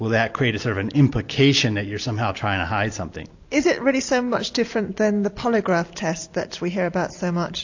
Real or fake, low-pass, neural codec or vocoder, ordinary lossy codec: real; 7.2 kHz; none; AAC, 48 kbps